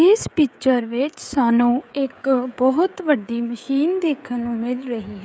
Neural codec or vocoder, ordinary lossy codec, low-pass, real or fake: codec, 16 kHz, 16 kbps, FreqCodec, smaller model; none; none; fake